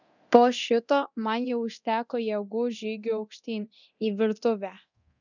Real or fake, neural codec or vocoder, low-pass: fake; codec, 24 kHz, 0.9 kbps, DualCodec; 7.2 kHz